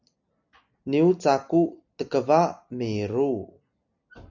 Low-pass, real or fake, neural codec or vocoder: 7.2 kHz; real; none